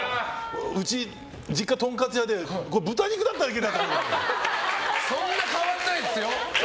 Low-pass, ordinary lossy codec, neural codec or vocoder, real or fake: none; none; none; real